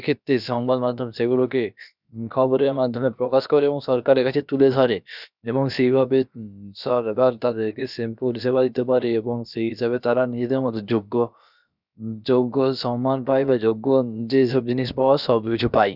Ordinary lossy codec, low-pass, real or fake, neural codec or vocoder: none; 5.4 kHz; fake; codec, 16 kHz, about 1 kbps, DyCAST, with the encoder's durations